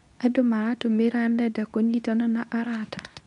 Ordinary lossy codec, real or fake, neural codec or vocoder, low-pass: MP3, 96 kbps; fake; codec, 24 kHz, 0.9 kbps, WavTokenizer, medium speech release version 2; 10.8 kHz